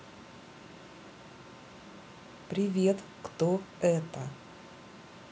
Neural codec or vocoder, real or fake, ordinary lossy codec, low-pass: none; real; none; none